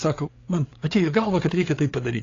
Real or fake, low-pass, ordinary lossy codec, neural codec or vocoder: fake; 7.2 kHz; AAC, 32 kbps; codec, 16 kHz, 8 kbps, FreqCodec, larger model